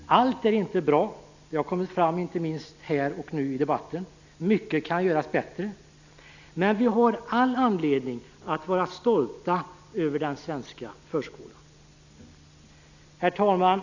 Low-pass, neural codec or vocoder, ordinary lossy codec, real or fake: 7.2 kHz; none; none; real